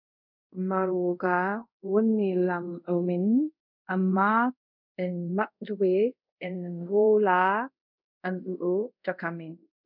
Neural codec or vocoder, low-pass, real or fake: codec, 24 kHz, 0.5 kbps, DualCodec; 5.4 kHz; fake